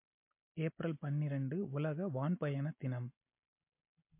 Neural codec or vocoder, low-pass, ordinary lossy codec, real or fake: none; 3.6 kHz; MP3, 24 kbps; real